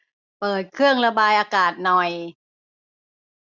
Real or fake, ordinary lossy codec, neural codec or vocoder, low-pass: real; none; none; 7.2 kHz